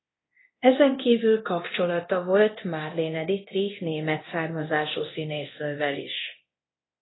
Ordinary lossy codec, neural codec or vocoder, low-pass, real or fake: AAC, 16 kbps; codec, 24 kHz, 0.9 kbps, DualCodec; 7.2 kHz; fake